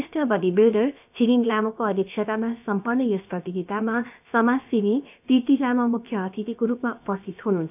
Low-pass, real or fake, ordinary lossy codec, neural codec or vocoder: 3.6 kHz; fake; none; codec, 16 kHz, about 1 kbps, DyCAST, with the encoder's durations